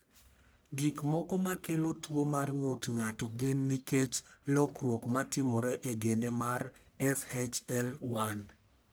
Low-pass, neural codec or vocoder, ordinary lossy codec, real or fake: none; codec, 44.1 kHz, 1.7 kbps, Pupu-Codec; none; fake